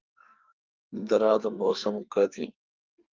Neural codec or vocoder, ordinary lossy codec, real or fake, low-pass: codec, 44.1 kHz, 2.6 kbps, SNAC; Opus, 24 kbps; fake; 7.2 kHz